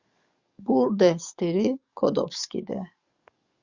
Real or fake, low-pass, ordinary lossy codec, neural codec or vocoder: fake; 7.2 kHz; Opus, 64 kbps; codec, 44.1 kHz, 7.8 kbps, DAC